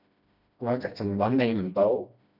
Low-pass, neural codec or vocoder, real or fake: 5.4 kHz; codec, 16 kHz, 1 kbps, FreqCodec, smaller model; fake